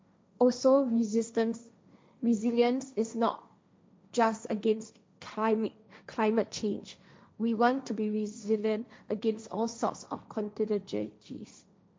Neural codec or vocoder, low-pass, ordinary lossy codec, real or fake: codec, 16 kHz, 1.1 kbps, Voila-Tokenizer; none; none; fake